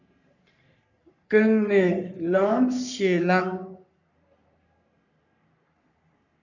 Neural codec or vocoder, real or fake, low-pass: codec, 44.1 kHz, 3.4 kbps, Pupu-Codec; fake; 7.2 kHz